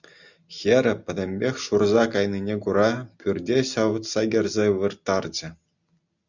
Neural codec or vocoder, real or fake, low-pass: none; real; 7.2 kHz